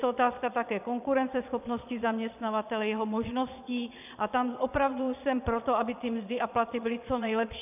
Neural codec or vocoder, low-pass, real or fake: vocoder, 22.05 kHz, 80 mel bands, Vocos; 3.6 kHz; fake